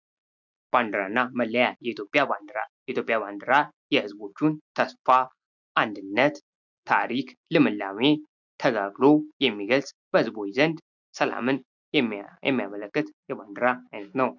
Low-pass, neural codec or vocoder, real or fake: 7.2 kHz; none; real